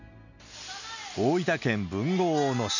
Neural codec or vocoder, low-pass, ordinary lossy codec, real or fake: none; 7.2 kHz; none; real